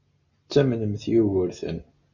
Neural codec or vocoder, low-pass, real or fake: none; 7.2 kHz; real